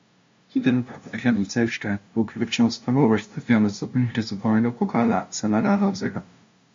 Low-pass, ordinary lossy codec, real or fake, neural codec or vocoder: 7.2 kHz; MP3, 48 kbps; fake; codec, 16 kHz, 0.5 kbps, FunCodec, trained on LibriTTS, 25 frames a second